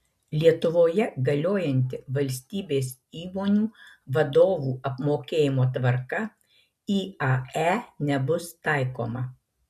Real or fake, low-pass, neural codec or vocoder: real; 14.4 kHz; none